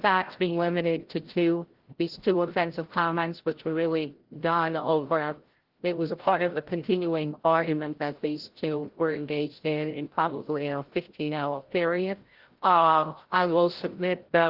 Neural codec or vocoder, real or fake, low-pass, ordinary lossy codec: codec, 16 kHz, 0.5 kbps, FreqCodec, larger model; fake; 5.4 kHz; Opus, 16 kbps